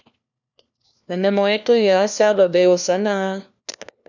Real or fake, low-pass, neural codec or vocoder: fake; 7.2 kHz; codec, 16 kHz, 1 kbps, FunCodec, trained on LibriTTS, 50 frames a second